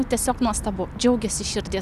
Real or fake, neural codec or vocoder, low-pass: real; none; 14.4 kHz